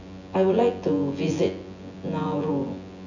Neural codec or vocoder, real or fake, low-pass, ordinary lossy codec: vocoder, 24 kHz, 100 mel bands, Vocos; fake; 7.2 kHz; none